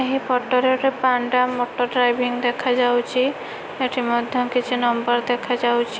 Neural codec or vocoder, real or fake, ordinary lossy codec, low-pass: none; real; none; none